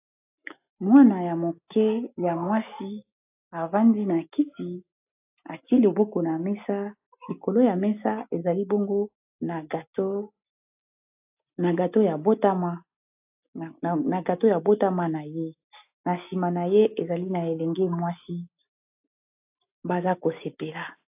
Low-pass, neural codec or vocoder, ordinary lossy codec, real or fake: 3.6 kHz; none; AAC, 32 kbps; real